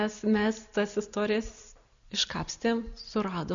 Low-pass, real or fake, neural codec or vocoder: 7.2 kHz; real; none